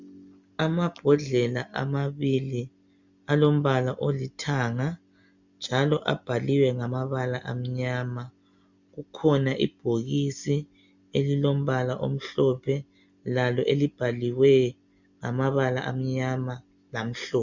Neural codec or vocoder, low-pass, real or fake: none; 7.2 kHz; real